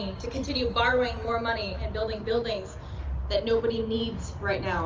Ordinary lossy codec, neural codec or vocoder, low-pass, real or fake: Opus, 24 kbps; none; 7.2 kHz; real